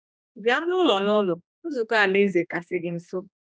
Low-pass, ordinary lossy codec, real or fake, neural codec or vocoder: none; none; fake; codec, 16 kHz, 1 kbps, X-Codec, HuBERT features, trained on general audio